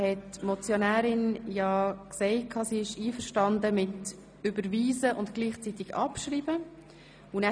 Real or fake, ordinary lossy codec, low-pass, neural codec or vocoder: real; none; 9.9 kHz; none